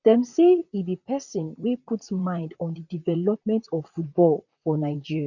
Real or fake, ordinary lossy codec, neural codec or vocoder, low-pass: fake; none; vocoder, 44.1 kHz, 128 mel bands, Pupu-Vocoder; 7.2 kHz